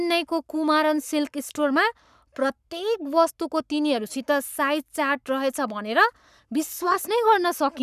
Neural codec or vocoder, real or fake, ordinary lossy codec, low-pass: codec, 44.1 kHz, 7.8 kbps, Pupu-Codec; fake; none; 14.4 kHz